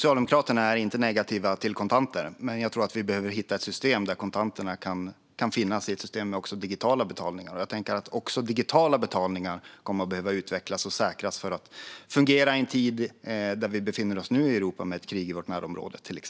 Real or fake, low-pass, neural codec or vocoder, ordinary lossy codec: real; none; none; none